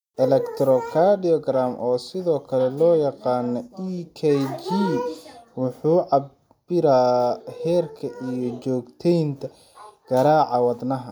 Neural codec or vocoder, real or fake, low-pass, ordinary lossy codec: none; real; 19.8 kHz; none